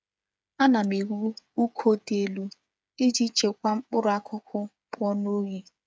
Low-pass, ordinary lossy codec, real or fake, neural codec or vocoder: none; none; fake; codec, 16 kHz, 8 kbps, FreqCodec, smaller model